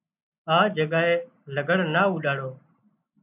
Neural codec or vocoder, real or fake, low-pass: none; real; 3.6 kHz